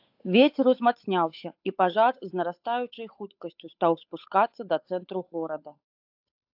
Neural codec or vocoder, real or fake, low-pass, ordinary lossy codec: codec, 16 kHz, 8 kbps, FunCodec, trained on Chinese and English, 25 frames a second; fake; 5.4 kHz; AAC, 48 kbps